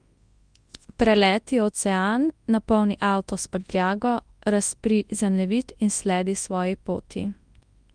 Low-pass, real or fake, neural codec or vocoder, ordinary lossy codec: 9.9 kHz; fake; codec, 24 kHz, 0.9 kbps, WavTokenizer, large speech release; Opus, 32 kbps